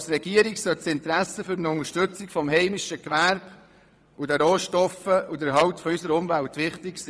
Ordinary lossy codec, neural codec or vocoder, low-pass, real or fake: none; vocoder, 22.05 kHz, 80 mel bands, WaveNeXt; none; fake